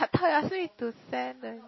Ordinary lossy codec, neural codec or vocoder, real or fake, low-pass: MP3, 24 kbps; none; real; 7.2 kHz